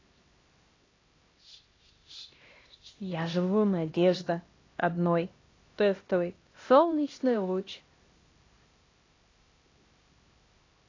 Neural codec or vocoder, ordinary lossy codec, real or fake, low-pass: codec, 16 kHz, 1 kbps, X-Codec, HuBERT features, trained on LibriSpeech; AAC, 32 kbps; fake; 7.2 kHz